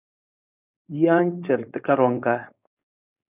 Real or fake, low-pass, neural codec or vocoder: fake; 3.6 kHz; codec, 16 kHz, 4.8 kbps, FACodec